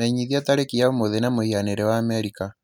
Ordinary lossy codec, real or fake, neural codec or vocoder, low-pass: none; real; none; 19.8 kHz